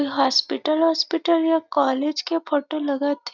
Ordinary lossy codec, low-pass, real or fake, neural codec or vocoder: none; 7.2 kHz; real; none